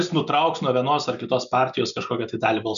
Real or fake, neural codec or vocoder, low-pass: real; none; 7.2 kHz